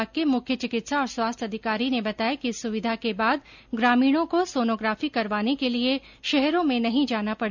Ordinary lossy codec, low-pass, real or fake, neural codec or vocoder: none; 7.2 kHz; real; none